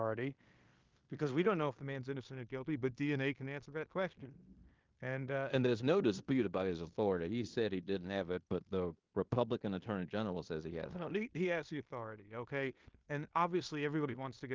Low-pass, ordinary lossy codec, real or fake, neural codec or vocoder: 7.2 kHz; Opus, 32 kbps; fake; codec, 16 kHz in and 24 kHz out, 0.9 kbps, LongCat-Audio-Codec, fine tuned four codebook decoder